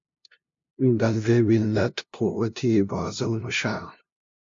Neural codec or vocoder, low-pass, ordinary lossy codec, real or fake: codec, 16 kHz, 0.5 kbps, FunCodec, trained on LibriTTS, 25 frames a second; 7.2 kHz; MP3, 48 kbps; fake